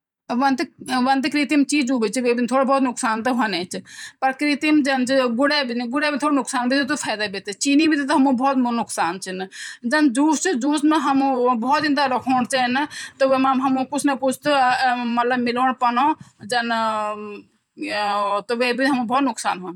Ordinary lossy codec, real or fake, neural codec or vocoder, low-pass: none; fake; vocoder, 44.1 kHz, 128 mel bands every 512 samples, BigVGAN v2; 19.8 kHz